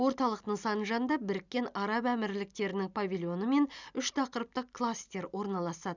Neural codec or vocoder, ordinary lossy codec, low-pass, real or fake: none; none; 7.2 kHz; real